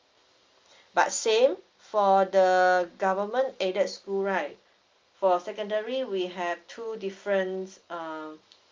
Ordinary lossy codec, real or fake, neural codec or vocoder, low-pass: Opus, 32 kbps; real; none; 7.2 kHz